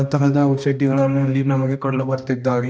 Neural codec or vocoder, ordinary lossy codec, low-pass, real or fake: codec, 16 kHz, 1 kbps, X-Codec, HuBERT features, trained on balanced general audio; none; none; fake